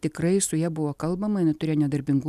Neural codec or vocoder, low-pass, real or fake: none; 14.4 kHz; real